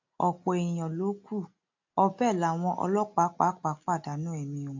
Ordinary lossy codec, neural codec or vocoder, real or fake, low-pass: AAC, 48 kbps; none; real; 7.2 kHz